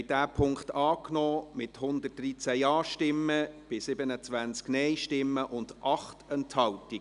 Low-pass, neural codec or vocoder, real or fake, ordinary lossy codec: 10.8 kHz; none; real; none